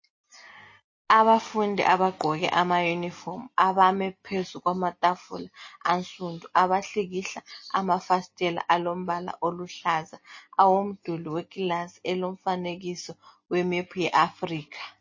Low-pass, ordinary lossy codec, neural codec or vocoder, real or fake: 7.2 kHz; MP3, 32 kbps; none; real